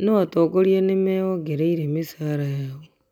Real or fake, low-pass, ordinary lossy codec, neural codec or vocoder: real; 19.8 kHz; none; none